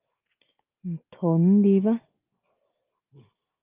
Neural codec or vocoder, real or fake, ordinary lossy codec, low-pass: none; real; Opus, 24 kbps; 3.6 kHz